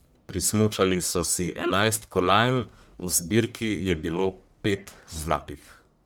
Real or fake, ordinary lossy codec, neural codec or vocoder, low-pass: fake; none; codec, 44.1 kHz, 1.7 kbps, Pupu-Codec; none